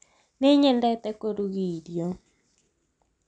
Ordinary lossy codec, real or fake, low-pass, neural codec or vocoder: none; real; 9.9 kHz; none